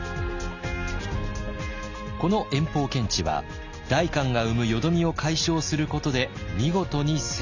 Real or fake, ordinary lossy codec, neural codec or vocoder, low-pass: real; none; none; 7.2 kHz